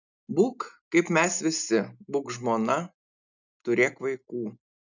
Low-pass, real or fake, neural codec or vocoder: 7.2 kHz; real; none